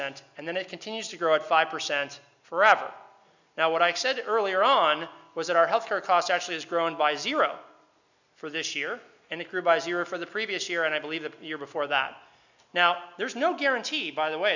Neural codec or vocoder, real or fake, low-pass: none; real; 7.2 kHz